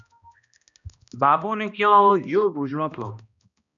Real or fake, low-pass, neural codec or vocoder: fake; 7.2 kHz; codec, 16 kHz, 1 kbps, X-Codec, HuBERT features, trained on balanced general audio